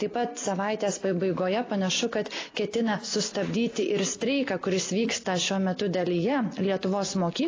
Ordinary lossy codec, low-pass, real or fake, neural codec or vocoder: AAC, 32 kbps; 7.2 kHz; real; none